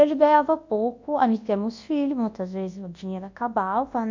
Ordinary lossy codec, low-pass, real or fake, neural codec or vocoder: MP3, 48 kbps; 7.2 kHz; fake; codec, 24 kHz, 0.9 kbps, WavTokenizer, large speech release